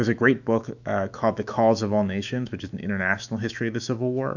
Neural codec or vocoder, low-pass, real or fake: autoencoder, 48 kHz, 128 numbers a frame, DAC-VAE, trained on Japanese speech; 7.2 kHz; fake